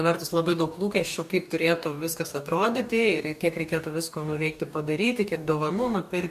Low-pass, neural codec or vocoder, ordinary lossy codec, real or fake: 14.4 kHz; codec, 44.1 kHz, 2.6 kbps, DAC; MP3, 96 kbps; fake